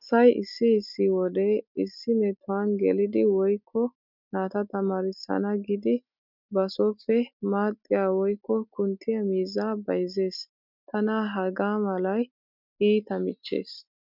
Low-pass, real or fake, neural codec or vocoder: 5.4 kHz; real; none